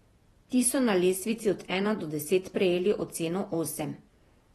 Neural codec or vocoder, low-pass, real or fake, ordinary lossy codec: vocoder, 48 kHz, 128 mel bands, Vocos; 19.8 kHz; fake; AAC, 32 kbps